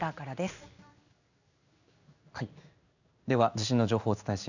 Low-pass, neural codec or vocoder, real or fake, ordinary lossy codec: 7.2 kHz; codec, 16 kHz in and 24 kHz out, 1 kbps, XY-Tokenizer; fake; none